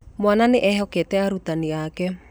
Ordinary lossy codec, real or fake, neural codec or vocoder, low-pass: none; real; none; none